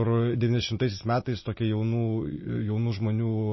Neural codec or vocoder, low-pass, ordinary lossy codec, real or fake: none; 7.2 kHz; MP3, 24 kbps; real